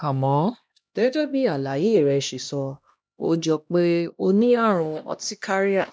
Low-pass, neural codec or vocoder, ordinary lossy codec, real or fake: none; codec, 16 kHz, 1 kbps, X-Codec, HuBERT features, trained on LibriSpeech; none; fake